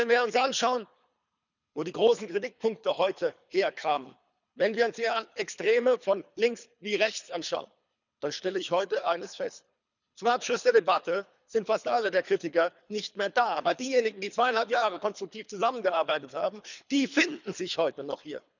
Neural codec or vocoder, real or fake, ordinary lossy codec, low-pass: codec, 24 kHz, 3 kbps, HILCodec; fake; none; 7.2 kHz